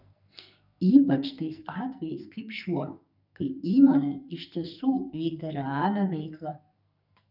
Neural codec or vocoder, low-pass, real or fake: codec, 44.1 kHz, 2.6 kbps, SNAC; 5.4 kHz; fake